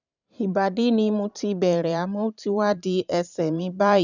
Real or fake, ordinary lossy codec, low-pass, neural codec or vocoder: fake; none; 7.2 kHz; vocoder, 44.1 kHz, 128 mel bands every 512 samples, BigVGAN v2